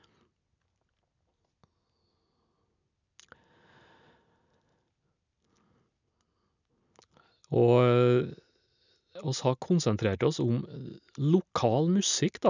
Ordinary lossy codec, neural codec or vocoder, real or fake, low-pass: none; none; real; 7.2 kHz